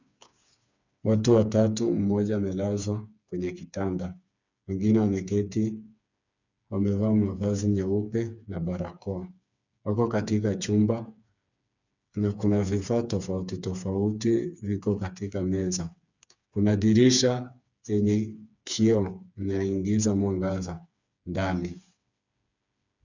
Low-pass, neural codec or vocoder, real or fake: 7.2 kHz; codec, 16 kHz, 4 kbps, FreqCodec, smaller model; fake